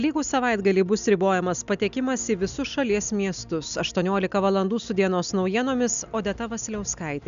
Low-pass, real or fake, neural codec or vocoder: 7.2 kHz; real; none